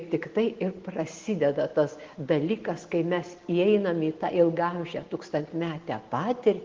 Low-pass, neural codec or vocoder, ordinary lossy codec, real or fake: 7.2 kHz; none; Opus, 16 kbps; real